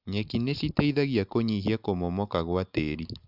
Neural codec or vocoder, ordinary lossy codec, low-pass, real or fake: none; none; 5.4 kHz; real